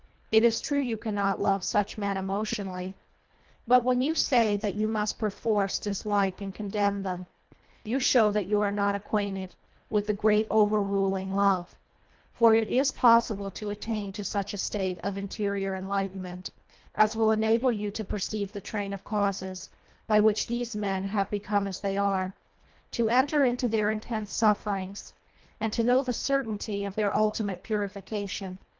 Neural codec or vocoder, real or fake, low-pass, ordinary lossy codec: codec, 24 kHz, 1.5 kbps, HILCodec; fake; 7.2 kHz; Opus, 32 kbps